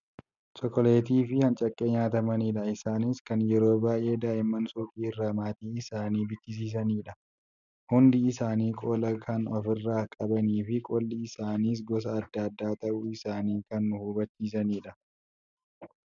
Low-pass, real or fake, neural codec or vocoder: 7.2 kHz; real; none